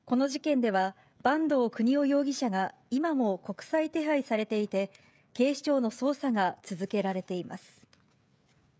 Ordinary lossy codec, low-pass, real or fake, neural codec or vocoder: none; none; fake; codec, 16 kHz, 16 kbps, FreqCodec, smaller model